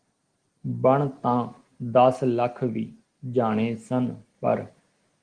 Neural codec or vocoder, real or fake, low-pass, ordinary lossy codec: none; real; 9.9 kHz; Opus, 24 kbps